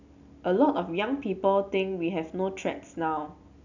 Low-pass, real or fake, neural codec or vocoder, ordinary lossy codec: 7.2 kHz; real; none; none